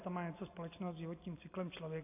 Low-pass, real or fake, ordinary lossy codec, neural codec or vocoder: 3.6 kHz; real; AAC, 32 kbps; none